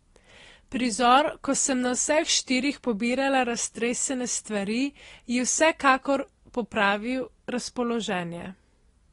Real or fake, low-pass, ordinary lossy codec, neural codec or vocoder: real; 10.8 kHz; AAC, 32 kbps; none